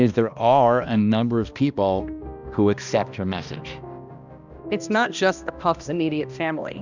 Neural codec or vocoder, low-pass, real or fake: codec, 16 kHz, 1 kbps, X-Codec, HuBERT features, trained on balanced general audio; 7.2 kHz; fake